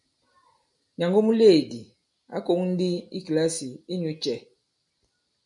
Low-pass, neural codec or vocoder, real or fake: 10.8 kHz; none; real